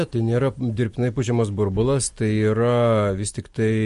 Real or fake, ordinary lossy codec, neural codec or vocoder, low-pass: real; MP3, 48 kbps; none; 14.4 kHz